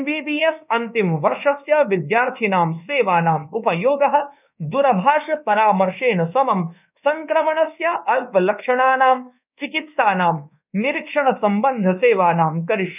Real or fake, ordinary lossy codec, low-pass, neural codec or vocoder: fake; none; 3.6 kHz; codec, 24 kHz, 1.2 kbps, DualCodec